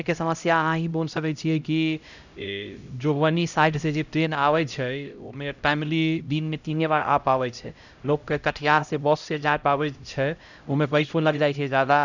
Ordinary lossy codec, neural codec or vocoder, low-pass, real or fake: none; codec, 16 kHz, 0.5 kbps, X-Codec, HuBERT features, trained on LibriSpeech; 7.2 kHz; fake